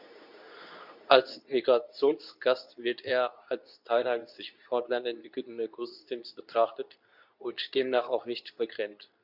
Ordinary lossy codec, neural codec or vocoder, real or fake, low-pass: none; codec, 24 kHz, 0.9 kbps, WavTokenizer, medium speech release version 2; fake; 5.4 kHz